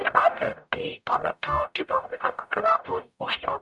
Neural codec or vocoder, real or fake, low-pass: codec, 44.1 kHz, 0.9 kbps, DAC; fake; 10.8 kHz